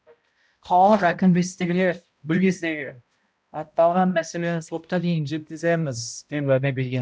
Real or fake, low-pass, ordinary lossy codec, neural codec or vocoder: fake; none; none; codec, 16 kHz, 0.5 kbps, X-Codec, HuBERT features, trained on balanced general audio